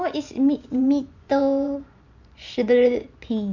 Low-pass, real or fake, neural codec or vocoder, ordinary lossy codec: 7.2 kHz; fake; vocoder, 24 kHz, 100 mel bands, Vocos; none